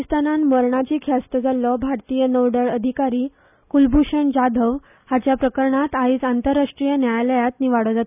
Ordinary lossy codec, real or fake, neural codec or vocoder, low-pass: none; real; none; 3.6 kHz